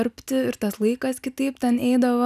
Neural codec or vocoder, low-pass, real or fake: none; 14.4 kHz; real